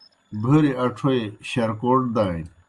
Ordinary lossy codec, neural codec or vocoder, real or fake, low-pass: Opus, 32 kbps; none; real; 10.8 kHz